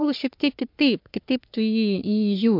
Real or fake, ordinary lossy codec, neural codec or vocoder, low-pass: fake; MP3, 48 kbps; codec, 44.1 kHz, 3.4 kbps, Pupu-Codec; 5.4 kHz